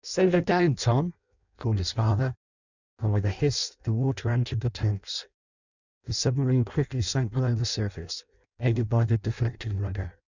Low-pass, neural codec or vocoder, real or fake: 7.2 kHz; codec, 16 kHz in and 24 kHz out, 0.6 kbps, FireRedTTS-2 codec; fake